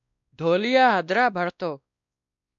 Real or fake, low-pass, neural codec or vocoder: fake; 7.2 kHz; codec, 16 kHz, 1 kbps, X-Codec, WavLM features, trained on Multilingual LibriSpeech